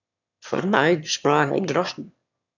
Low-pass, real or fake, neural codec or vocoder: 7.2 kHz; fake; autoencoder, 22.05 kHz, a latent of 192 numbers a frame, VITS, trained on one speaker